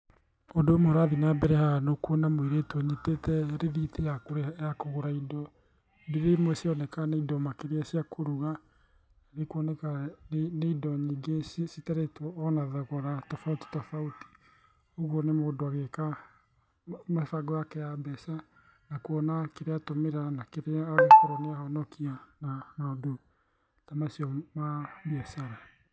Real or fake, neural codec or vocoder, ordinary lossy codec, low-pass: real; none; none; none